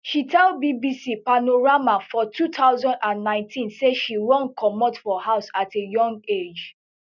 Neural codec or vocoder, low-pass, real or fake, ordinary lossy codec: none; 7.2 kHz; real; none